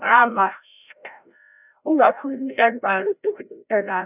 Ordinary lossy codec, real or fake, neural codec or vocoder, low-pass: none; fake; codec, 16 kHz, 0.5 kbps, FreqCodec, larger model; 3.6 kHz